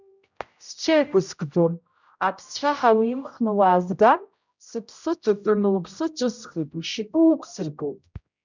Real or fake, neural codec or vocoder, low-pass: fake; codec, 16 kHz, 0.5 kbps, X-Codec, HuBERT features, trained on general audio; 7.2 kHz